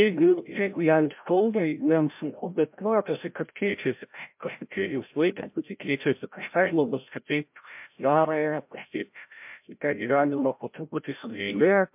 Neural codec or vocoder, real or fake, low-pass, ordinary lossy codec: codec, 16 kHz, 0.5 kbps, FreqCodec, larger model; fake; 3.6 kHz; MP3, 32 kbps